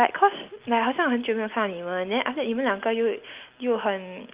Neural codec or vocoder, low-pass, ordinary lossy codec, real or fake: none; 3.6 kHz; Opus, 32 kbps; real